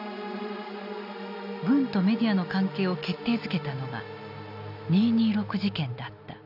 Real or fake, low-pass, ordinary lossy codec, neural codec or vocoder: real; 5.4 kHz; none; none